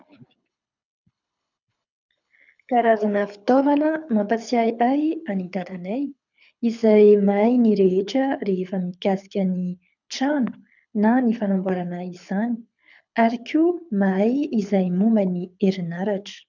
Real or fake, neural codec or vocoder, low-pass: fake; codec, 24 kHz, 6 kbps, HILCodec; 7.2 kHz